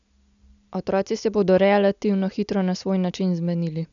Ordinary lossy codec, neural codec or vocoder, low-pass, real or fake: none; none; 7.2 kHz; real